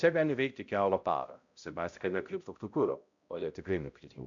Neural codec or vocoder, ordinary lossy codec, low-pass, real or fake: codec, 16 kHz, 0.5 kbps, X-Codec, HuBERT features, trained on balanced general audio; MP3, 64 kbps; 7.2 kHz; fake